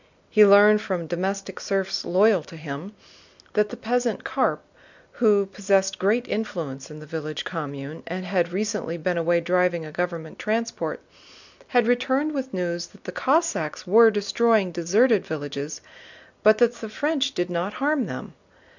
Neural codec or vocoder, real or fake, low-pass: none; real; 7.2 kHz